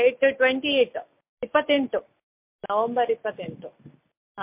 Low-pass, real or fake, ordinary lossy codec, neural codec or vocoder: 3.6 kHz; real; MP3, 32 kbps; none